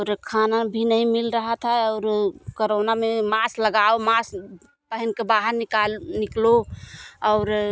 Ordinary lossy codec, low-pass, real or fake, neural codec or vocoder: none; none; real; none